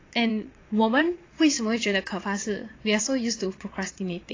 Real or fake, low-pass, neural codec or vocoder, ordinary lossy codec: fake; 7.2 kHz; vocoder, 22.05 kHz, 80 mel bands, Vocos; AAC, 32 kbps